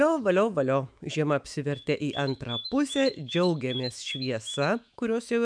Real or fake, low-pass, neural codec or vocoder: fake; 9.9 kHz; autoencoder, 48 kHz, 128 numbers a frame, DAC-VAE, trained on Japanese speech